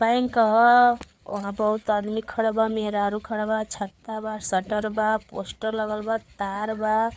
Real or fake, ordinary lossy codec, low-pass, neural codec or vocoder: fake; none; none; codec, 16 kHz, 4 kbps, FreqCodec, larger model